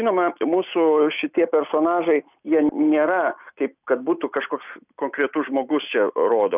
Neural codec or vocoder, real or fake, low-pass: none; real; 3.6 kHz